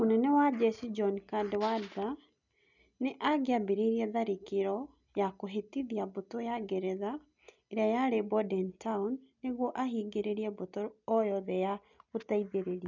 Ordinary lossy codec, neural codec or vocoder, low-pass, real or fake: none; none; 7.2 kHz; real